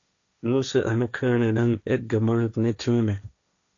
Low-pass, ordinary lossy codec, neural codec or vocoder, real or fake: 7.2 kHz; AAC, 64 kbps; codec, 16 kHz, 1.1 kbps, Voila-Tokenizer; fake